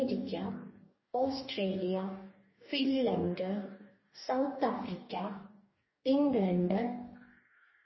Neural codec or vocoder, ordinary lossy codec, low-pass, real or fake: codec, 44.1 kHz, 2.6 kbps, DAC; MP3, 24 kbps; 7.2 kHz; fake